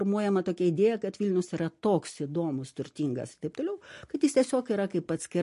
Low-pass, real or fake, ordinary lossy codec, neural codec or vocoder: 14.4 kHz; real; MP3, 48 kbps; none